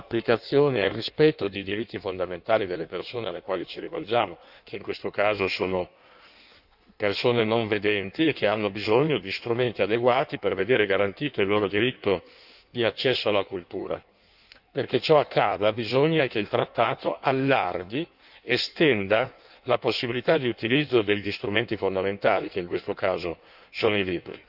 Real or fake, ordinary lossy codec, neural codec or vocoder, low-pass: fake; none; codec, 16 kHz in and 24 kHz out, 1.1 kbps, FireRedTTS-2 codec; 5.4 kHz